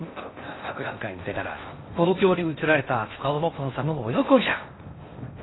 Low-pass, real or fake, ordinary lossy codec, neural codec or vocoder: 7.2 kHz; fake; AAC, 16 kbps; codec, 16 kHz in and 24 kHz out, 0.6 kbps, FocalCodec, streaming, 4096 codes